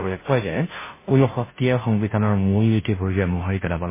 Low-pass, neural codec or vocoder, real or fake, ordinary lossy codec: 3.6 kHz; codec, 16 kHz, 0.5 kbps, FunCodec, trained on Chinese and English, 25 frames a second; fake; MP3, 16 kbps